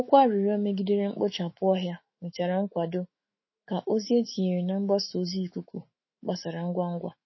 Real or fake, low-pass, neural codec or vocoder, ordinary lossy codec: fake; 7.2 kHz; autoencoder, 48 kHz, 128 numbers a frame, DAC-VAE, trained on Japanese speech; MP3, 24 kbps